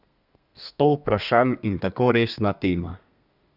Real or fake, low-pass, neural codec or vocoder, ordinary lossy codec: fake; 5.4 kHz; codec, 32 kHz, 1.9 kbps, SNAC; none